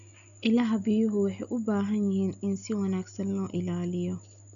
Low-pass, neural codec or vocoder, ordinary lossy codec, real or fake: 7.2 kHz; none; AAC, 64 kbps; real